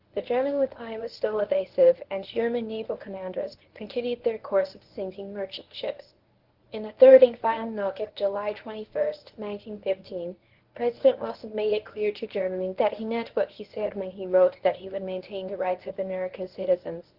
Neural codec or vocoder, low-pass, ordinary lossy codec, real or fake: codec, 24 kHz, 0.9 kbps, WavTokenizer, medium speech release version 1; 5.4 kHz; Opus, 16 kbps; fake